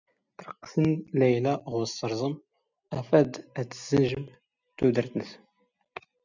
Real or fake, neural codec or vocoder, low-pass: real; none; 7.2 kHz